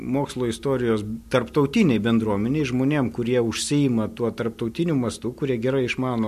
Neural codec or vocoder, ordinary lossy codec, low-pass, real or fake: none; MP3, 64 kbps; 14.4 kHz; real